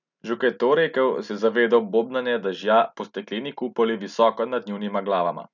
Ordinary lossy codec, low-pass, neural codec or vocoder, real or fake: none; 7.2 kHz; none; real